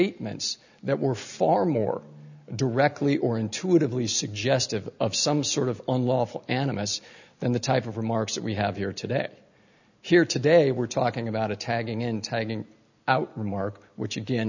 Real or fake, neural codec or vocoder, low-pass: real; none; 7.2 kHz